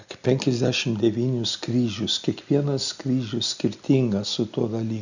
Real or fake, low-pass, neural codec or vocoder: real; 7.2 kHz; none